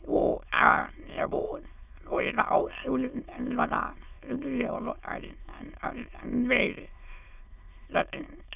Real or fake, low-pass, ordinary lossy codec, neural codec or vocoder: fake; 3.6 kHz; none; autoencoder, 22.05 kHz, a latent of 192 numbers a frame, VITS, trained on many speakers